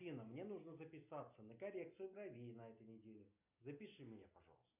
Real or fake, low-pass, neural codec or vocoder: real; 3.6 kHz; none